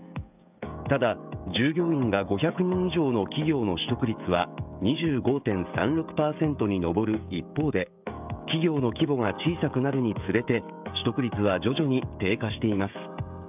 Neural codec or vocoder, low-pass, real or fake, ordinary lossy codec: codec, 16 kHz, 16 kbps, FreqCodec, smaller model; 3.6 kHz; fake; none